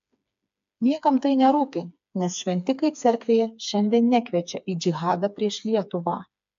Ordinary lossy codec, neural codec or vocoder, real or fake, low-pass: AAC, 64 kbps; codec, 16 kHz, 4 kbps, FreqCodec, smaller model; fake; 7.2 kHz